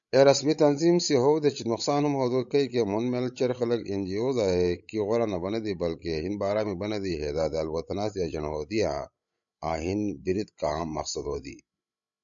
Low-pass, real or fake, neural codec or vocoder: 7.2 kHz; fake; codec, 16 kHz, 16 kbps, FreqCodec, larger model